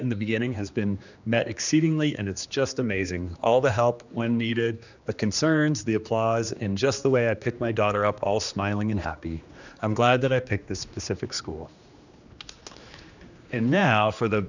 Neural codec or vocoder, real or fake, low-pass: codec, 16 kHz, 2 kbps, X-Codec, HuBERT features, trained on general audio; fake; 7.2 kHz